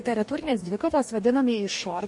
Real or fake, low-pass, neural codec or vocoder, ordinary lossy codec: fake; 10.8 kHz; codec, 44.1 kHz, 2.6 kbps, DAC; MP3, 48 kbps